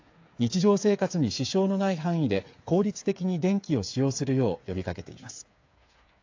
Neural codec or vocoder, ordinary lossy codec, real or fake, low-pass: codec, 16 kHz, 8 kbps, FreqCodec, smaller model; AAC, 48 kbps; fake; 7.2 kHz